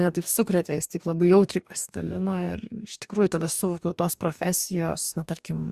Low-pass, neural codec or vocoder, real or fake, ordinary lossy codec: 14.4 kHz; codec, 44.1 kHz, 2.6 kbps, DAC; fake; Opus, 64 kbps